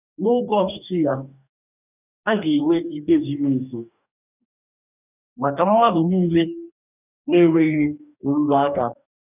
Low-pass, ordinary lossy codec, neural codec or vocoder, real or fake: 3.6 kHz; none; codec, 44.1 kHz, 2.6 kbps, DAC; fake